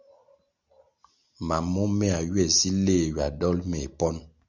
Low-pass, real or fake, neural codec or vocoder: 7.2 kHz; real; none